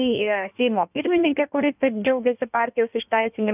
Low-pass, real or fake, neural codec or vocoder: 3.6 kHz; fake; codec, 16 kHz in and 24 kHz out, 1.1 kbps, FireRedTTS-2 codec